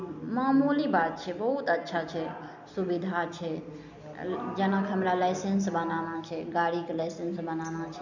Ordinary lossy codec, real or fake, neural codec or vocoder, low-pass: none; real; none; 7.2 kHz